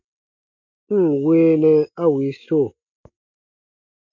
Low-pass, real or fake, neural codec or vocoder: 7.2 kHz; real; none